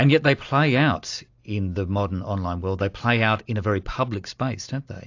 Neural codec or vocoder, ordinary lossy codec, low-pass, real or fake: none; AAC, 48 kbps; 7.2 kHz; real